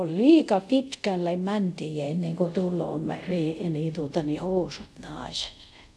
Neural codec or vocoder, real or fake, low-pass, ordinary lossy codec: codec, 24 kHz, 0.5 kbps, DualCodec; fake; none; none